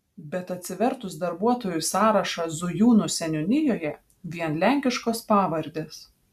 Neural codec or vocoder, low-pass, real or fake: none; 14.4 kHz; real